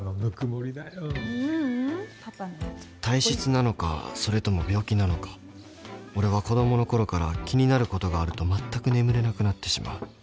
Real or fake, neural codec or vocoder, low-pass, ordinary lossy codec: real; none; none; none